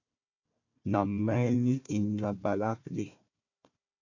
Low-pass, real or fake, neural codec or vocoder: 7.2 kHz; fake; codec, 16 kHz, 1 kbps, FunCodec, trained on Chinese and English, 50 frames a second